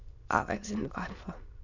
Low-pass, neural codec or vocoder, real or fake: 7.2 kHz; autoencoder, 22.05 kHz, a latent of 192 numbers a frame, VITS, trained on many speakers; fake